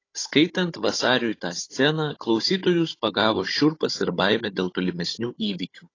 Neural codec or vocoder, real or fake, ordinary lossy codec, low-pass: codec, 16 kHz, 16 kbps, FunCodec, trained on Chinese and English, 50 frames a second; fake; AAC, 32 kbps; 7.2 kHz